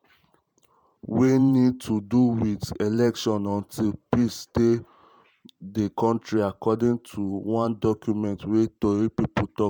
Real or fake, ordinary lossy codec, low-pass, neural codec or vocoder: fake; MP3, 96 kbps; 19.8 kHz; vocoder, 44.1 kHz, 128 mel bands every 512 samples, BigVGAN v2